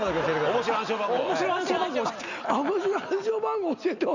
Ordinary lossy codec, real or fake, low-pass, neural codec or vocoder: Opus, 64 kbps; real; 7.2 kHz; none